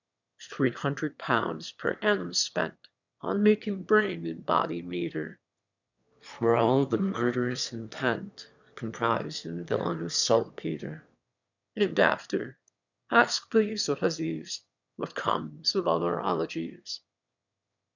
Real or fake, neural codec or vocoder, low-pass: fake; autoencoder, 22.05 kHz, a latent of 192 numbers a frame, VITS, trained on one speaker; 7.2 kHz